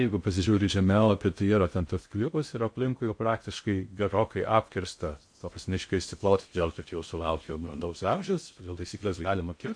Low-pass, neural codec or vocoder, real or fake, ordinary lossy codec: 9.9 kHz; codec, 16 kHz in and 24 kHz out, 0.6 kbps, FocalCodec, streaming, 2048 codes; fake; MP3, 48 kbps